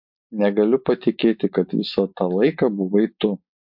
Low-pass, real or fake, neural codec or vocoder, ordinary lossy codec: 5.4 kHz; real; none; MP3, 48 kbps